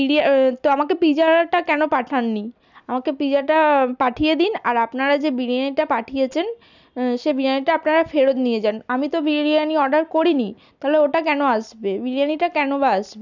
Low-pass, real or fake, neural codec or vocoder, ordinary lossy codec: 7.2 kHz; real; none; none